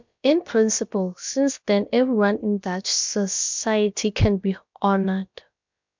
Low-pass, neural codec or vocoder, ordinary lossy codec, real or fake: 7.2 kHz; codec, 16 kHz, about 1 kbps, DyCAST, with the encoder's durations; MP3, 64 kbps; fake